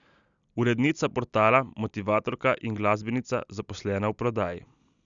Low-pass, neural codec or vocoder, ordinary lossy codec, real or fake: 7.2 kHz; none; none; real